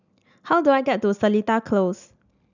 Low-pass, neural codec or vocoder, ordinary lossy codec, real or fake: 7.2 kHz; none; none; real